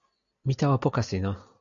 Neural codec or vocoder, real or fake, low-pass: none; real; 7.2 kHz